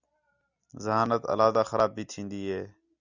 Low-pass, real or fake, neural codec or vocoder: 7.2 kHz; real; none